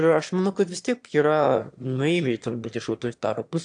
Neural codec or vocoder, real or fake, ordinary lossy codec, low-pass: autoencoder, 22.05 kHz, a latent of 192 numbers a frame, VITS, trained on one speaker; fake; AAC, 64 kbps; 9.9 kHz